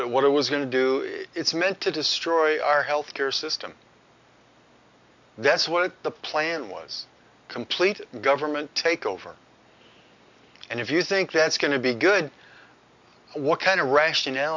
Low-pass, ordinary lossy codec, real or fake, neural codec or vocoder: 7.2 kHz; MP3, 64 kbps; real; none